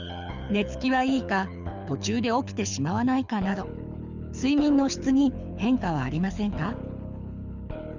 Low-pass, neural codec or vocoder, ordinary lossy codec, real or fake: 7.2 kHz; codec, 24 kHz, 6 kbps, HILCodec; Opus, 64 kbps; fake